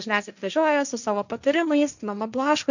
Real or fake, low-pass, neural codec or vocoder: fake; 7.2 kHz; codec, 16 kHz, 1.1 kbps, Voila-Tokenizer